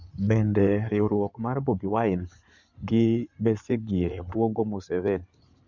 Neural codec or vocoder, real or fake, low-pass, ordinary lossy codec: codec, 16 kHz in and 24 kHz out, 2.2 kbps, FireRedTTS-2 codec; fake; 7.2 kHz; none